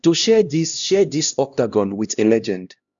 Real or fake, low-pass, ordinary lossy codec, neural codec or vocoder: fake; 7.2 kHz; none; codec, 16 kHz, 1 kbps, X-Codec, HuBERT features, trained on LibriSpeech